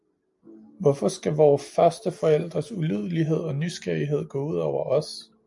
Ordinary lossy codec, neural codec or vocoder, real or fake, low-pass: MP3, 64 kbps; none; real; 10.8 kHz